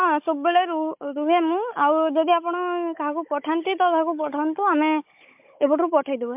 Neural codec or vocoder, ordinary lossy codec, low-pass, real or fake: codec, 16 kHz, 16 kbps, FunCodec, trained on Chinese and English, 50 frames a second; MP3, 32 kbps; 3.6 kHz; fake